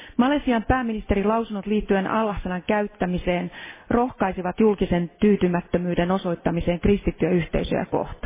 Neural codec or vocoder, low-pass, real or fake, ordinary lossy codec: none; 3.6 kHz; real; MP3, 16 kbps